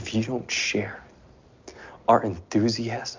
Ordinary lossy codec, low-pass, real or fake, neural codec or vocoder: MP3, 64 kbps; 7.2 kHz; real; none